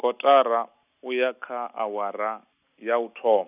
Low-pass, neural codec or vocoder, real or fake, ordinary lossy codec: 3.6 kHz; none; real; none